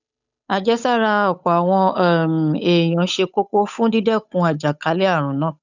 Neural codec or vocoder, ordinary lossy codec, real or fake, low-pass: codec, 16 kHz, 8 kbps, FunCodec, trained on Chinese and English, 25 frames a second; none; fake; 7.2 kHz